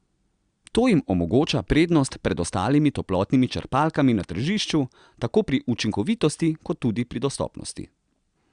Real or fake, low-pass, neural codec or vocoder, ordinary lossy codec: real; 9.9 kHz; none; Opus, 64 kbps